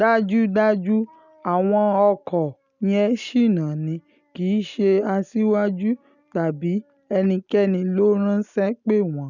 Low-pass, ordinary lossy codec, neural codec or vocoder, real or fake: 7.2 kHz; none; none; real